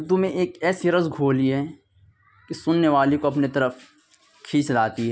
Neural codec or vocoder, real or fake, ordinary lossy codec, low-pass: none; real; none; none